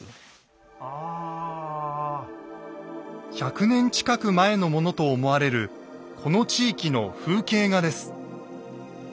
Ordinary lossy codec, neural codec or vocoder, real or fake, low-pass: none; none; real; none